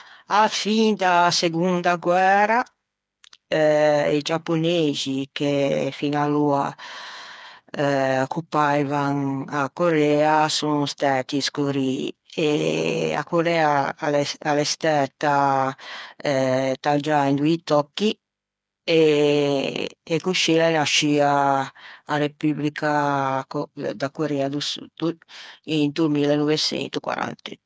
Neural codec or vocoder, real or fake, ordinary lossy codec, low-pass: codec, 16 kHz, 4 kbps, FreqCodec, smaller model; fake; none; none